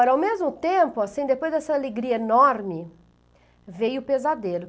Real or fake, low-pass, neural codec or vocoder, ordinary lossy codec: real; none; none; none